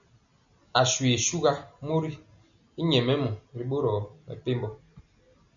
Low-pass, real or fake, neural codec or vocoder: 7.2 kHz; real; none